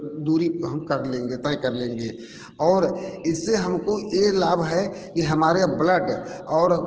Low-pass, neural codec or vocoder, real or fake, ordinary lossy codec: 7.2 kHz; codec, 44.1 kHz, 7.8 kbps, DAC; fake; Opus, 16 kbps